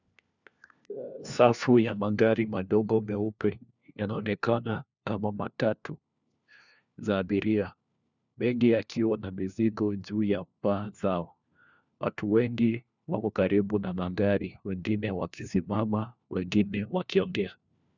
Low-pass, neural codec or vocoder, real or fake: 7.2 kHz; codec, 16 kHz, 1 kbps, FunCodec, trained on LibriTTS, 50 frames a second; fake